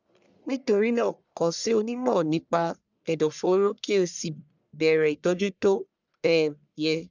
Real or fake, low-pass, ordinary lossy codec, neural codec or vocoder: fake; 7.2 kHz; none; codec, 44.1 kHz, 1.7 kbps, Pupu-Codec